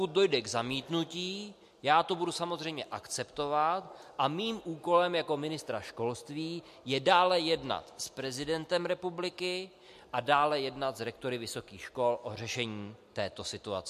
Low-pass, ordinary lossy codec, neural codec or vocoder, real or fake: 14.4 kHz; MP3, 64 kbps; none; real